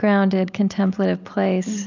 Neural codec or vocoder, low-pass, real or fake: none; 7.2 kHz; real